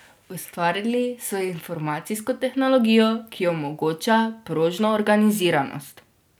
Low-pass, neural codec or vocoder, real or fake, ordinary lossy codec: none; none; real; none